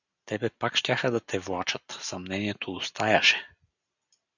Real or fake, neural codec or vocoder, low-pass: real; none; 7.2 kHz